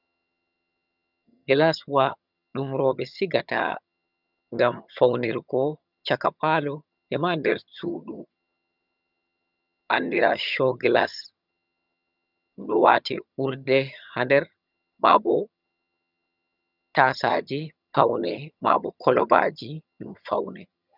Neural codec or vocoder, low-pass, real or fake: vocoder, 22.05 kHz, 80 mel bands, HiFi-GAN; 5.4 kHz; fake